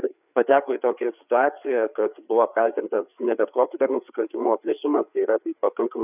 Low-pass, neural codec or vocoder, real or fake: 3.6 kHz; codec, 16 kHz, 4 kbps, FreqCodec, larger model; fake